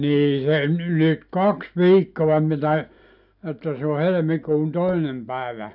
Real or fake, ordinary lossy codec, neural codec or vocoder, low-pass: real; none; none; 5.4 kHz